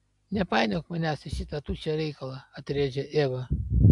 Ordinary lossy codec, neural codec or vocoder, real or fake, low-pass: AAC, 64 kbps; none; real; 10.8 kHz